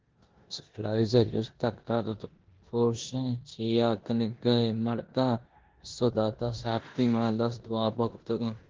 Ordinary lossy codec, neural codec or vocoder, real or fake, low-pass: Opus, 16 kbps; codec, 16 kHz in and 24 kHz out, 0.9 kbps, LongCat-Audio-Codec, four codebook decoder; fake; 7.2 kHz